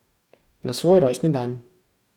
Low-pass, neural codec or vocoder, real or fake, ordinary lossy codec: 19.8 kHz; codec, 44.1 kHz, 2.6 kbps, DAC; fake; none